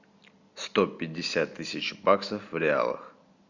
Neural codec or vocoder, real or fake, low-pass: none; real; 7.2 kHz